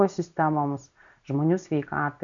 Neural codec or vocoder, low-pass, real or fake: none; 7.2 kHz; real